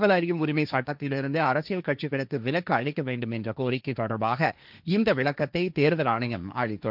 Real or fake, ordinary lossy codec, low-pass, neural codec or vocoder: fake; none; 5.4 kHz; codec, 16 kHz, 1.1 kbps, Voila-Tokenizer